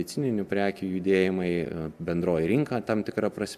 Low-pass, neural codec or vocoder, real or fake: 14.4 kHz; none; real